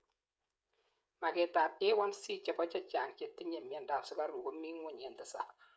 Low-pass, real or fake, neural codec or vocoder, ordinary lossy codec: none; fake; codec, 16 kHz, 16 kbps, FreqCodec, smaller model; none